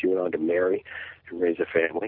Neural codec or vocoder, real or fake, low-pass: none; real; 5.4 kHz